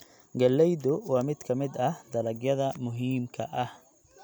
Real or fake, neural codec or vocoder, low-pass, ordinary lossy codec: real; none; none; none